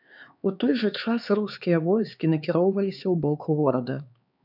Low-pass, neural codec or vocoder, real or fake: 5.4 kHz; codec, 16 kHz, 4 kbps, X-Codec, HuBERT features, trained on LibriSpeech; fake